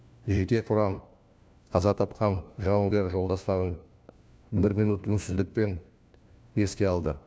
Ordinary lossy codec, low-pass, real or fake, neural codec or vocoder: none; none; fake; codec, 16 kHz, 1 kbps, FunCodec, trained on LibriTTS, 50 frames a second